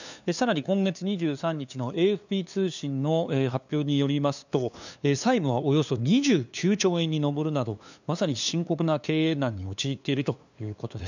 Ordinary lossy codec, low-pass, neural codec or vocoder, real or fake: none; 7.2 kHz; codec, 16 kHz, 2 kbps, FunCodec, trained on LibriTTS, 25 frames a second; fake